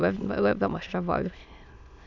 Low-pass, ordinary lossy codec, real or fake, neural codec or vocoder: 7.2 kHz; none; fake; autoencoder, 22.05 kHz, a latent of 192 numbers a frame, VITS, trained on many speakers